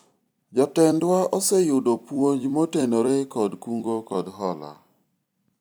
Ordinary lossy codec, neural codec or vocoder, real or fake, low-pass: none; vocoder, 44.1 kHz, 128 mel bands every 256 samples, BigVGAN v2; fake; none